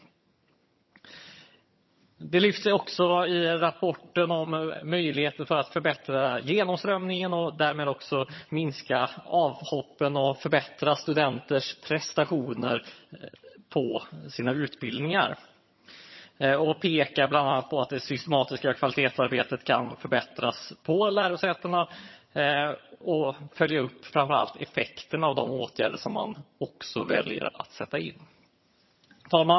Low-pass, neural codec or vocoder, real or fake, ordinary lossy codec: 7.2 kHz; vocoder, 22.05 kHz, 80 mel bands, HiFi-GAN; fake; MP3, 24 kbps